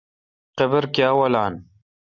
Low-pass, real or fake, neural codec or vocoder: 7.2 kHz; real; none